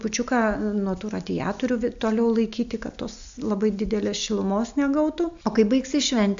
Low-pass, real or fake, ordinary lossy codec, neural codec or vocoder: 7.2 kHz; real; AAC, 64 kbps; none